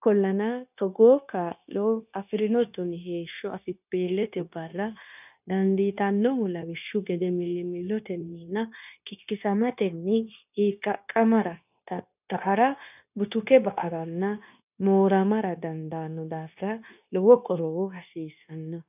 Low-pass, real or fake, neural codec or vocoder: 3.6 kHz; fake; codec, 16 kHz, 0.9 kbps, LongCat-Audio-Codec